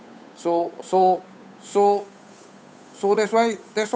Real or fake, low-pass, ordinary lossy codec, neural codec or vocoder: fake; none; none; codec, 16 kHz, 8 kbps, FunCodec, trained on Chinese and English, 25 frames a second